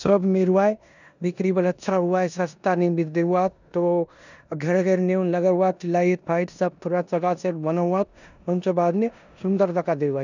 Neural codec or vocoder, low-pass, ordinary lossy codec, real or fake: codec, 16 kHz in and 24 kHz out, 0.9 kbps, LongCat-Audio-Codec, four codebook decoder; 7.2 kHz; none; fake